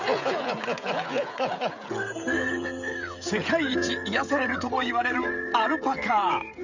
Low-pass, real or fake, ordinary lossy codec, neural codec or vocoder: 7.2 kHz; fake; none; codec, 16 kHz, 16 kbps, FreqCodec, smaller model